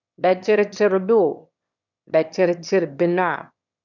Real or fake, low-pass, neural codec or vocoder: fake; 7.2 kHz; autoencoder, 22.05 kHz, a latent of 192 numbers a frame, VITS, trained on one speaker